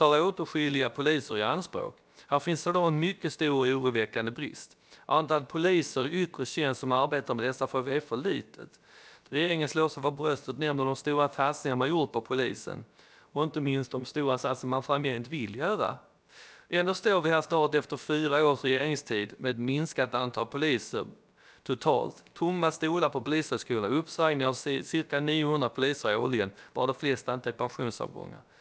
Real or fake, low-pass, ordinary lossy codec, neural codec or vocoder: fake; none; none; codec, 16 kHz, about 1 kbps, DyCAST, with the encoder's durations